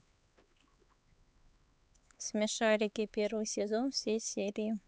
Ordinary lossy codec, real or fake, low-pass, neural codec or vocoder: none; fake; none; codec, 16 kHz, 4 kbps, X-Codec, HuBERT features, trained on LibriSpeech